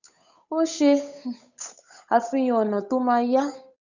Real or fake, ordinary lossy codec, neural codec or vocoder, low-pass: fake; none; codec, 16 kHz, 8 kbps, FunCodec, trained on Chinese and English, 25 frames a second; 7.2 kHz